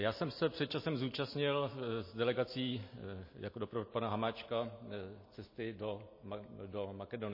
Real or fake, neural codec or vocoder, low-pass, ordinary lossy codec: real; none; 5.4 kHz; MP3, 24 kbps